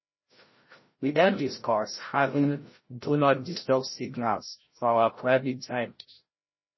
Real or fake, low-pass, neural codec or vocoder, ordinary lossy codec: fake; 7.2 kHz; codec, 16 kHz, 0.5 kbps, FreqCodec, larger model; MP3, 24 kbps